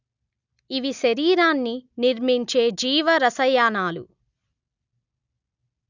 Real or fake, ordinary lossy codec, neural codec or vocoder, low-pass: real; none; none; 7.2 kHz